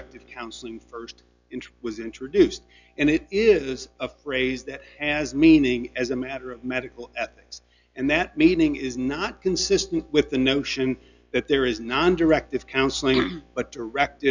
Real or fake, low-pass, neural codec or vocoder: real; 7.2 kHz; none